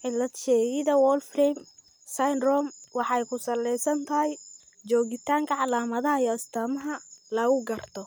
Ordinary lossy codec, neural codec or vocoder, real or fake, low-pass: none; none; real; none